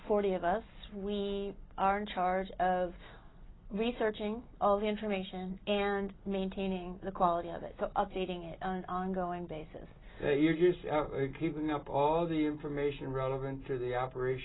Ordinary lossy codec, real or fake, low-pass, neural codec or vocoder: AAC, 16 kbps; real; 7.2 kHz; none